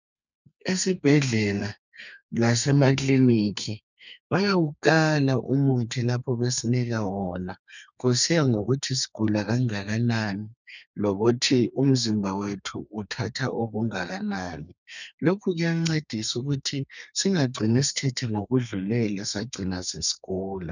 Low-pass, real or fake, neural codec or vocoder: 7.2 kHz; fake; codec, 32 kHz, 1.9 kbps, SNAC